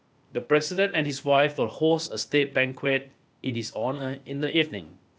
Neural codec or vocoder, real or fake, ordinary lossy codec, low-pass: codec, 16 kHz, 0.8 kbps, ZipCodec; fake; none; none